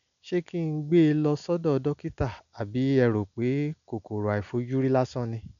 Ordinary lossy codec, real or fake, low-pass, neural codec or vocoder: none; real; 7.2 kHz; none